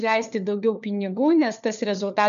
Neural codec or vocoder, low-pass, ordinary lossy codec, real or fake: codec, 16 kHz, 4 kbps, FunCodec, trained on LibriTTS, 50 frames a second; 7.2 kHz; MP3, 96 kbps; fake